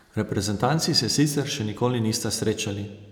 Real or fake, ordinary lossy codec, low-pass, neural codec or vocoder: real; none; none; none